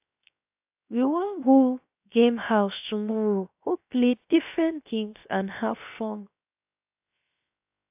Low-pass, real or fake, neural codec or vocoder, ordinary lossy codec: 3.6 kHz; fake; codec, 16 kHz, 0.7 kbps, FocalCodec; AAC, 32 kbps